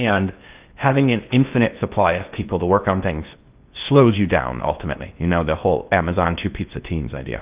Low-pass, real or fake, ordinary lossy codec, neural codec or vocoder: 3.6 kHz; fake; Opus, 24 kbps; codec, 16 kHz in and 24 kHz out, 0.6 kbps, FocalCodec, streaming, 2048 codes